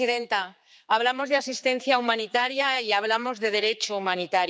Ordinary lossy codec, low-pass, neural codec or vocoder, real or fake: none; none; codec, 16 kHz, 4 kbps, X-Codec, HuBERT features, trained on general audio; fake